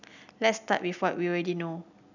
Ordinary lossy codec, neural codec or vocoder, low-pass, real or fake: none; none; 7.2 kHz; real